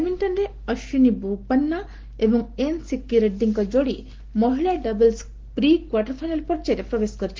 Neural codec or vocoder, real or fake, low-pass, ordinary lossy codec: none; real; 7.2 kHz; Opus, 16 kbps